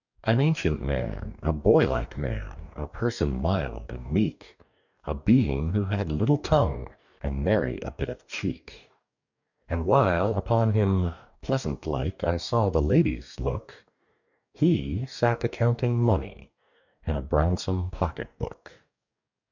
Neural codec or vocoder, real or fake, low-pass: codec, 44.1 kHz, 2.6 kbps, DAC; fake; 7.2 kHz